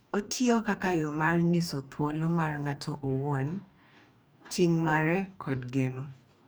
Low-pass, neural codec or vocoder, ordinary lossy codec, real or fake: none; codec, 44.1 kHz, 2.6 kbps, DAC; none; fake